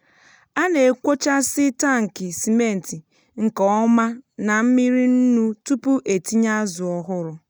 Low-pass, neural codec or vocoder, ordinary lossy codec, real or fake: none; none; none; real